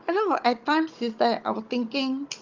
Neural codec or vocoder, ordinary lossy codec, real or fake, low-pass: codec, 16 kHz, 16 kbps, FunCodec, trained on Chinese and English, 50 frames a second; Opus, 24 kbps; fake; 7.2 kHz